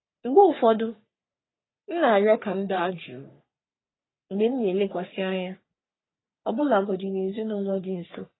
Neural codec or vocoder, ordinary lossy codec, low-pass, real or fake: codec, 44.1 kHz, 3.4 kbps, Pupu-Codec; AAC, 16 kbps; 7.2 kHz; fake